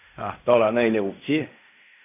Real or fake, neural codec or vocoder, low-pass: fake; codec, 16 kHz in and 24 kHz out, 0.4 kbps, LongCat-Audio-Codec, fine tuned four codebook decoder; 3.6 kHz